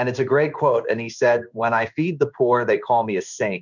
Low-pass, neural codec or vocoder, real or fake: 7.2 kHz; codec, 16 kHz in and 24 kHz out, 1 kbps, XY-Tokenizer; fake